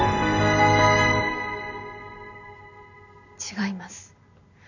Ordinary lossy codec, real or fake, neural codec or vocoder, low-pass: none; real; none; 7.2 kHz